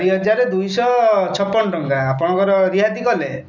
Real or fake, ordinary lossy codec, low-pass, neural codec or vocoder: real; none; 7.2 kHz; none